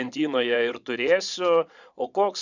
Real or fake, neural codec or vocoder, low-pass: fake; vocoder, 22.05 kHz, 80 mel bands, Vocos; 7.2 kHz